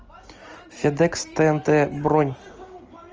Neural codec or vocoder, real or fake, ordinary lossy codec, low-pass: none; real; Opus, 24 kbps; 7.2 kHz